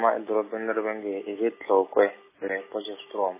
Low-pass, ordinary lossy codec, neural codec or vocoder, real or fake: 3.6 kHz; MP3, 16 kbps; none; real